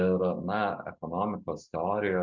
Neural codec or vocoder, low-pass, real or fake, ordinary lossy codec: none; 7.2 kHz; real; MP3, 64 kbps